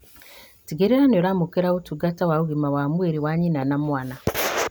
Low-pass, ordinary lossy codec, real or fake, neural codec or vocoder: none; none; real; none